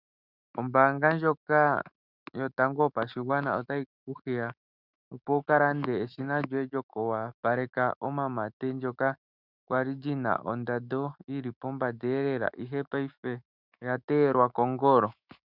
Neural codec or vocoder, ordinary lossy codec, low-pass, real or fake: autoencoder, 48 kHz, 128 numbers a frame, DAC-VAE, trained on Japanese speech; Opus, 64 kbps; 5.4 kHz; fake